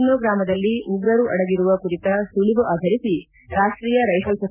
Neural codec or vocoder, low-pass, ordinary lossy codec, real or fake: none; 3.6 kHz; none; real